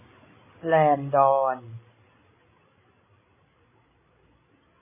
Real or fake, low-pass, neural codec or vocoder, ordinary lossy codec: fake; 3.6 kHz; codec, 16 kHz, 16 kbps, FreqCodec, larger model; MP3, 16 kbps